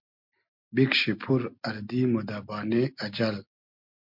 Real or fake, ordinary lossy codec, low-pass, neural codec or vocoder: real; MP3, 48 kbps; 5.4 kHz; none